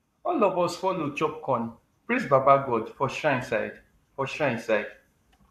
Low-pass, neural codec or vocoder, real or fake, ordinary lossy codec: 14.4 kHz; codec, 44.1 kHz, 7.8 kbps, Pupu-Codec; fake; none